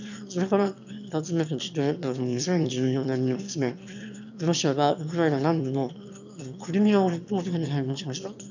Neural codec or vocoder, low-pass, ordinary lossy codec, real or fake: autoencoder, 22.05 kHz, a latent of 192 numbers a frame, VITS, trained on one speaker; 7.2 kHz; none; fake